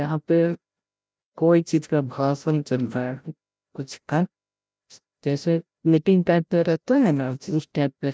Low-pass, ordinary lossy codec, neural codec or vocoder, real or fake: none; none; codec, 16 kHz, 0.5 kbps, FreqCodec, larger model; fake